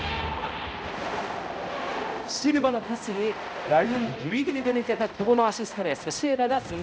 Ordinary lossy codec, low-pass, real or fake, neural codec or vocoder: none; none; fake; codec, 16 kHz, 0.5 kbps, X-Codec, HuBERT features, trained on balanced general audio